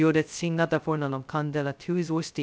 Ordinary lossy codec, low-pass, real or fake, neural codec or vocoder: none; none; fake; codec, 16 kHz, 0.2 kbps, FocalCodec